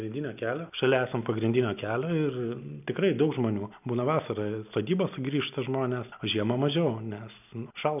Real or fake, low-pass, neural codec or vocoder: real; 3.6 kHz; none